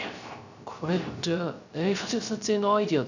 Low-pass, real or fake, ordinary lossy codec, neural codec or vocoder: 7.2 kHz; fake; none; codec, 16 kHz, 0.3 kbps, FocalCodec